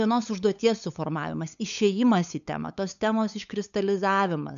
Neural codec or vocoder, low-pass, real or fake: codec, 16 kHz, 8 kbps, FunCodec, trained on LibriTTS, 25 frames a second; 7.2 kHz; fake